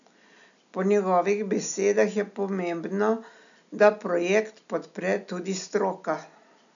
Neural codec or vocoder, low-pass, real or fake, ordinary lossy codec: none; 7.2 kHz; real; none